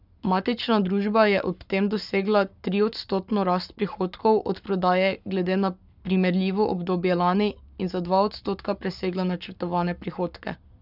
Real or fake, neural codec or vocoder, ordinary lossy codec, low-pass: fake; codec, 44.1 kHz, 7.8 kbps, Pupu-Codec; none; 5.4 kHz